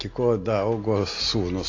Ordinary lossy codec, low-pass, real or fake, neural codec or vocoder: AAC, 32 kbps; 7.2 kHz; real; none